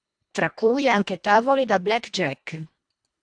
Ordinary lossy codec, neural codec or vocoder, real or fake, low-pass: AAC, 64 kbps; codec, 24 kHz, 1.5 kbps, HILCodec; fake; 9.9 kHz